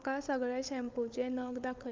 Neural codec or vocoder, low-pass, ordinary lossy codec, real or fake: codec, 16 kHz, 16 kbps, FunCodec, trained on LibriTTS, 50 frames a second; 7.2 kHz; Opus, 32 kbps; fake